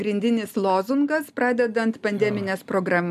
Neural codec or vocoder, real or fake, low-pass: none; real; 14.4 kHz